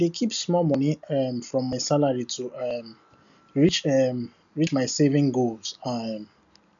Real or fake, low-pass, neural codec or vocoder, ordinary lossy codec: real; 7.2 kHz; none; none